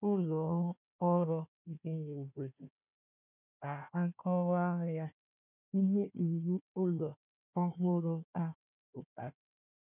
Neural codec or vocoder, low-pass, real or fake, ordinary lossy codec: codec, 16 kHz, 1 kbps, FunCodec, trained on Chinese and English, 50 frames a second; 3.6 kHz; fake; none